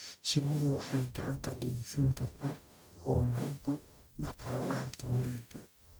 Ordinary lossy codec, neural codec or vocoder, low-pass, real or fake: none; codec, 44.1 kHz, 0.9 kbps, DAC; none; fake